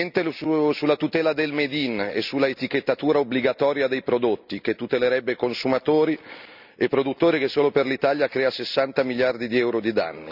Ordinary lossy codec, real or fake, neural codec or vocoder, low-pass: none; real; none; 5.4 kHz